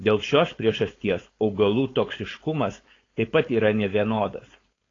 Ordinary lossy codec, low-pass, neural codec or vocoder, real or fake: AAC, 32 kbps; 7.2 kHz; codec, 16 kHz, 4.8 kbps, FACodec; fake